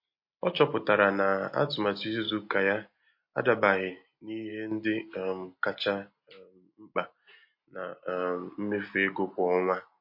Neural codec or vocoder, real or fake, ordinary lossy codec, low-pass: none; real; MP3, 32 kbps; 5.4 kHz